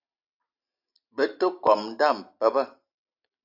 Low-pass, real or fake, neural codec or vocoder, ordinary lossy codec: 5.4 kHz; real; none; AAC, 48 kbps